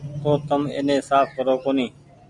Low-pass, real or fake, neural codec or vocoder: 10.8 kHz; real; none